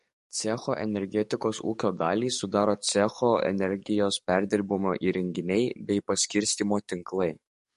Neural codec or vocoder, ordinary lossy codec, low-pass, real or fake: codec, 44.1 kHz, 7.8 kbps, DAC; MP3, 48 kbps; 14.4 kHz; fake